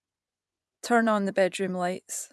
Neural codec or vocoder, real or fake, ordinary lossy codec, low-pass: vocoder, 24 kHz, 100 mel bands, Vocos; fake; none; none